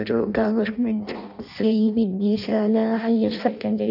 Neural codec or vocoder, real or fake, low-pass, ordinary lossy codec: codec, 16 kHz in and 24 kHz out, 0.6 kbps, FireRedTTS-2 codec; fake; 5.4 kHz; none